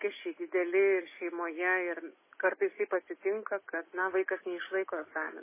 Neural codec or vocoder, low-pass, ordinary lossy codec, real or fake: none; 3.6 kHz; MP3, 16 kbps; real